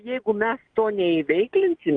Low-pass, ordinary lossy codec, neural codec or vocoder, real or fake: 9.9 kHz; Opus, 32 kbps; none; real